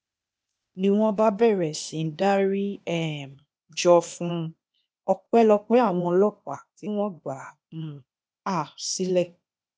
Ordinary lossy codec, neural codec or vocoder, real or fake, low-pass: none; codec, 16 kHz, 0.8 kbps, ZipCodec; fake; none